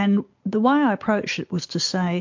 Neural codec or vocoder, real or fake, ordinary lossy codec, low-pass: none; real; MP3, 48 kbps; 7.2 kHz